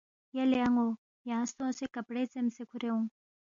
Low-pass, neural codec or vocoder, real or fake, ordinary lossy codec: 7.2 kHz; none; real; MP3, 64 kbps